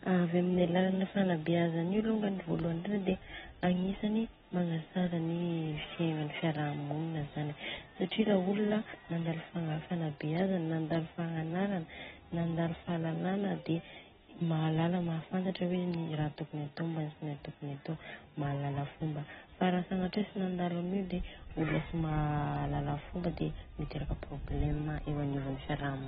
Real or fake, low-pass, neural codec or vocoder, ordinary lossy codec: real; 7.2 kHz; none; AAC, 16 kbps